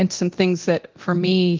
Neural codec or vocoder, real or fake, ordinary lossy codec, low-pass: codec, 24 kHz, 0.9 kbps, DualCodec; fake; Opus, 32 kbps; 7.2 kHz